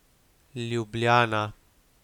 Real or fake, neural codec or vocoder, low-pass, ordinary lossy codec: real; none; 19.8 kHz; none